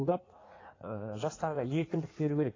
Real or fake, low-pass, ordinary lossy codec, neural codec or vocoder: fake; 7.2 kHz; AAC, 32 kbps; codec, 16 kHz in and 24 kHz out, 1.1 kbps, FireRedTTS-2 codec